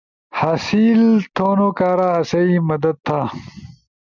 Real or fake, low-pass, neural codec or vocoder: real; 7.2 kHz; none